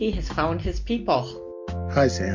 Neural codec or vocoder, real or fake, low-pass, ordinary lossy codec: none; real; 7.2 kHz; MP3, 48 kbps